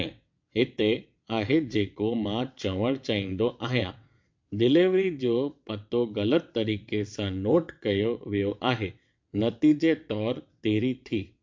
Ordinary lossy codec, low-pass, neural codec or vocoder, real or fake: MP3, 48 kbps; 7.2 kHz; vocoder, 22.05 kHz, 80 mel bands, WaveNeXt; fake